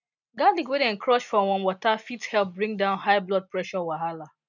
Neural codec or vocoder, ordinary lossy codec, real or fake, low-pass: none; none; real; 7.2 kHz